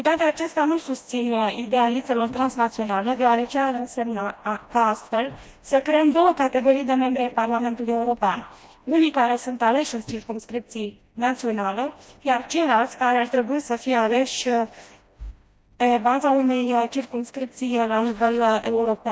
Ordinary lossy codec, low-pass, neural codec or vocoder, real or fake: none; none; codec, 16 kHz, 1 kbps, FreqCodec, smaller model; fake